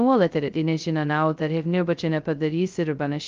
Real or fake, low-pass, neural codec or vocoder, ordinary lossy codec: fake; 7.2 kHz; codec, 16 kHz, 0.2 kbps, FocalCodec; Opus, 32 kbps